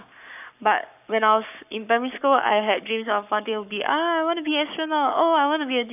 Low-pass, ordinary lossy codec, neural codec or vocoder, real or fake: 3.6 kHz; none; codec, 44.1 kHz, 7.8 kbps, Pupu-Codec; fake